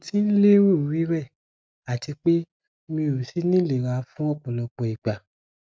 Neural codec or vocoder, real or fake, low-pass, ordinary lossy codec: none; real; none; none